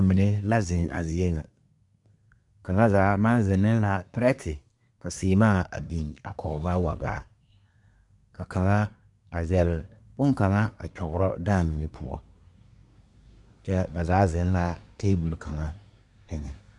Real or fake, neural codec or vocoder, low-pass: fake; codec, 24 kHz, 1 kbps, SNAC; 10.8 kHz